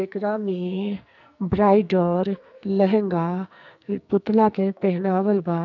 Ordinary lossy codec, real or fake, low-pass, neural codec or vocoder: none; fake; 7.2 kHz; codec, 44.1 kHz, 2.6 kbps, SNAC